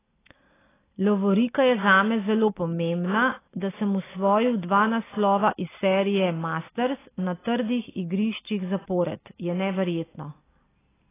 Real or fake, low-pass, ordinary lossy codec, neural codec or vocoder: real; 3.6 kHz; AAC, 16 kbps; none